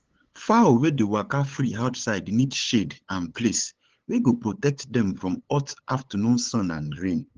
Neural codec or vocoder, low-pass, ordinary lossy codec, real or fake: codec, 16 kHz, 8 kbps, FunCodec, trained on LibriTTS, 25 frames a second; 7.2 kHz; Opus, 16 kbps; fake